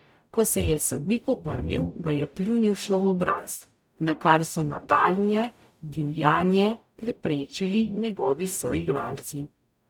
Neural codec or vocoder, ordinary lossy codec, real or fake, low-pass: codec, 44.1 kHz, 0.9 kbps, DAC; none; fake; 19.8 kHz